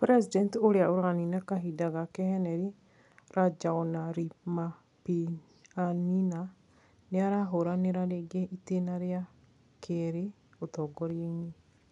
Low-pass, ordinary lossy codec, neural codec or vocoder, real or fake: 10.8 kHz; none; none; real